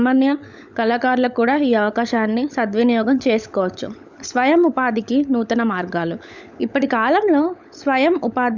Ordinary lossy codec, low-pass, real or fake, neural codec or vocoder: none; 7.2 kHz; fake; codec, 16 kHz, 16 kbps, FunCodec, trained on LibriTTS, 50 frames a second